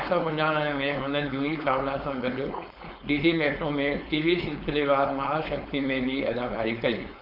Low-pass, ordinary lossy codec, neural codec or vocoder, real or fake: 5.4 kHz; none; codec, 16 kHz, 4.8 kbps, FACodec; fake